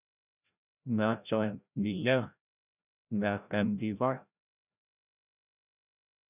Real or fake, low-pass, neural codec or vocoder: fake; 3.6 kHz; codec, 16 kHz, 0.5 kbps, FreqCodec, larger model